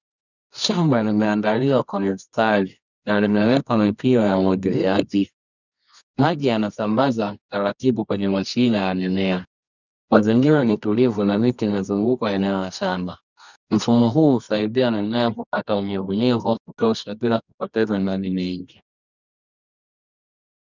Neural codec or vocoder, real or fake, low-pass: codec, 24 kHz, 0.9 kbps, WavTokenizer, medium music audio release; fake; 7.2 kHz